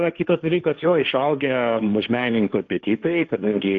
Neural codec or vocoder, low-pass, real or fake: codec, 16 kHz, 1.1 kbps, Voila-Tokenizer; 7.2 kHz; fake